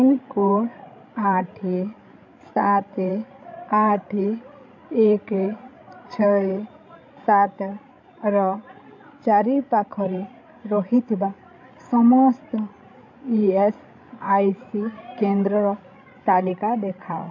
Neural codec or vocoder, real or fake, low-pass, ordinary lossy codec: codec, 16 kHz, 16 kbps, FreqCodec, larger model; fake; 7.2 kHz; none